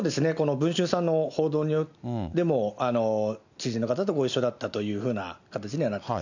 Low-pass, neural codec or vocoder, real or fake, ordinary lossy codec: 7.2 kHz; none; real; none